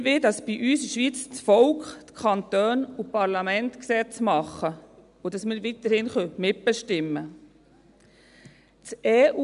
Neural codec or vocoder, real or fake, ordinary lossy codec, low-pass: none; real; AAC, 96 kbps; 10.8 kHz